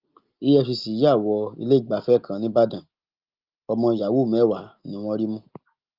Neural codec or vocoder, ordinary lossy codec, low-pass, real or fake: none; Opus, 32 kbps; 5.4 kHz; real